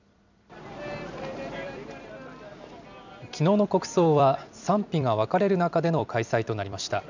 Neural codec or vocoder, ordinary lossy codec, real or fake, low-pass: vocoder, 44.1 kHz, 128 mel bands every 256 samples, BigVGAN v2; none; fake; 7.2 kHz